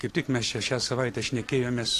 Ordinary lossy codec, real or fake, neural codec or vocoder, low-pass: AAC, 48 kbps; fake; vocoder, 44.1 kHz, 128 mel bands every 256 samples, BigVGAN v2; 14.4 kHz